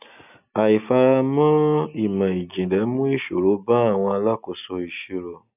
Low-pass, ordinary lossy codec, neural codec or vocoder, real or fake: 3.6 kHz; none; none; real